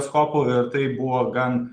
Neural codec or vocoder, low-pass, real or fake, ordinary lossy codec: none; 9.9 kHz; real; Opus, 32 kbps